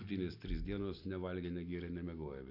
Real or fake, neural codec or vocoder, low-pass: real; none; 5.4 kHz